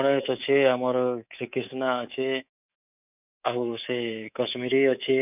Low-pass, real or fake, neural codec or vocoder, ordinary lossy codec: 3.6 kHz; fake; codec, 24 kHz, 3.1 kbps, DualCodec; none